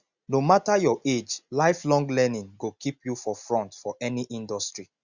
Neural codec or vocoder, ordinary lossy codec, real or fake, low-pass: none; Opus, 64 kbps; real; 7.2 kHz